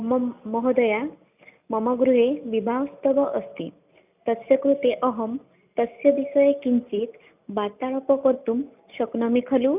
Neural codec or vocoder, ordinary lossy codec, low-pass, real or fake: none; none; 3.6 kHz; real